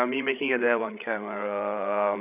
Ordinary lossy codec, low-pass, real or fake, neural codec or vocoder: none; 3.6 kHz; fake; codec, 16 kHz, 16 kbps, FreqCodec, larger model